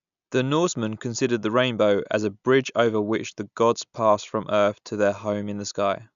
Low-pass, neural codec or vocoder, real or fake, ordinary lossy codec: 7.2 kHz; none; real; none